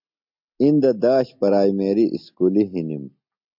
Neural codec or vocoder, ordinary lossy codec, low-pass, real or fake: none; MP3, 48 kbps; 5.4 kHz; real